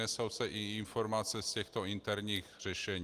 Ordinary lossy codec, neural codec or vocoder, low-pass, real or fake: Opus, 24 kbps; none; 14.4 kHz; real